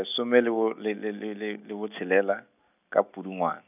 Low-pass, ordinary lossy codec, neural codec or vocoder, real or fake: 3.6 kHz; none; none; real